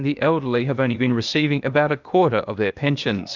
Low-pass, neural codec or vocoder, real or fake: 7.2 kHz; codec, 16 kHz, 0.8 kbps, ZipCodec; fake